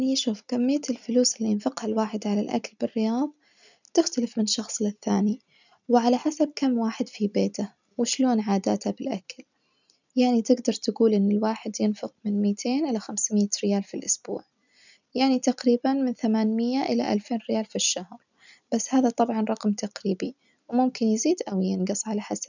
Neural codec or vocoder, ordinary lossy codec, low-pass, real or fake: none; none; 7.2 kHz; real